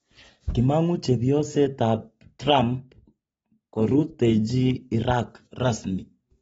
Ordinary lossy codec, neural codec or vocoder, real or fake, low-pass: AAC, 24 kbps; none; real; 10.8 kHz